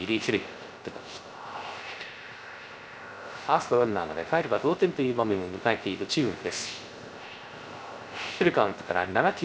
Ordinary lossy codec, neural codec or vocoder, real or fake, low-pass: none; codec, 16 kHz, 0.3 kbps, FocalCodec; fake; none